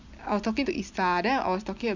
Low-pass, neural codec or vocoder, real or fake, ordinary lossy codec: 7.2 kHz; none; real; Opus, 64 kbps